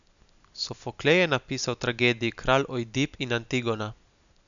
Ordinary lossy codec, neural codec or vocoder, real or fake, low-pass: none; none; real; 7.2 kHz